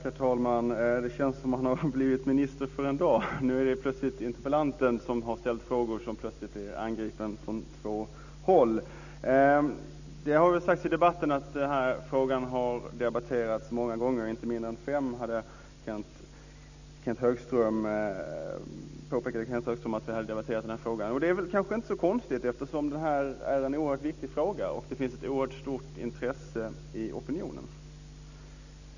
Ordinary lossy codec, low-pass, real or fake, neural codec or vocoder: none; 7.2 kHz; real; none